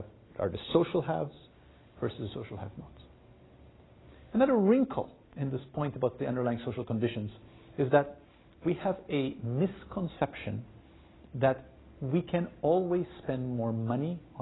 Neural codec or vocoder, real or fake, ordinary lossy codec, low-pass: none; real; AAC, 16 kbps; 7.2 kHz